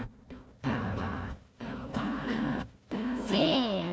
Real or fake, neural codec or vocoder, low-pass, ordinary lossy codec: fake; codec, 16 kHz, 1 kbps, FunCodec, trained on Chinese and English, 50 frames a second; none; none